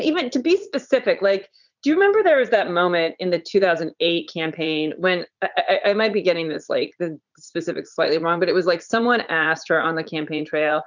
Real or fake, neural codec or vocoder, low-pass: fake; vocoder, 44.1 kHz, 80 mel bands, Vocos; 7.2 kHz